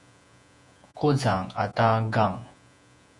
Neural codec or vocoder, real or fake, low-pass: vocoder, 48 kHz, 128 mel bands, Vocos; fake; 10.8 kHz